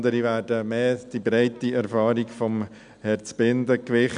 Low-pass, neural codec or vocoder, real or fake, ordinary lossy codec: 9.9 kHz; none; real; none